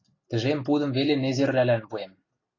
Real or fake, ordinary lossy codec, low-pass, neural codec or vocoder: real; AAC, 32 kbps; 7.2 kHz; none